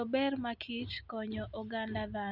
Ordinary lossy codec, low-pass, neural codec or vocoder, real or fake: Opus, 64 kbps; 5.4 kHz; none; real